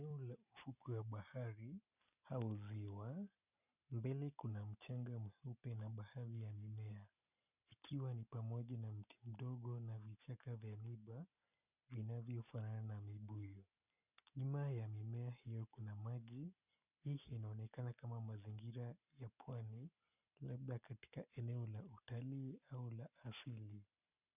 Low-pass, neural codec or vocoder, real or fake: 3.6 kHz; none; real